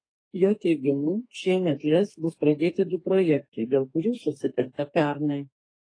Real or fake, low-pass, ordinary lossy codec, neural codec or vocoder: fake; 9.9 kHz; AAC, 32 kbps; codec, 32 kHz, 1.9 kbps, SNAC